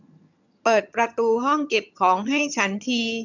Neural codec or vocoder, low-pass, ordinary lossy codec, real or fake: vocoder, 22.05 kHz, 80 mel bands, HiFi-GAN; 7.2 kHz; none; fake